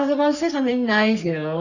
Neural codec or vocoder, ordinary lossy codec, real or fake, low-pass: codec, 44.1 kHz, 3.4 kbps, Pupu-Codec; none; fake; 7.2 kHz